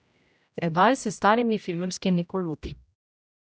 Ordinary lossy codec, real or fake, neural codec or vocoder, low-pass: none; fake; codec, 16 kHz, 0.5 kbps, X-Codec, HuBERT features, trained on general audio; none